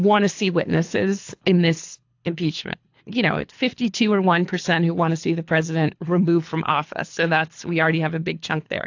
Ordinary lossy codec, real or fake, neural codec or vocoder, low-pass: AAC, 48 kbps; fake; codec, 24 kHz, 3 kbps, HILCodec; 7.2 kHz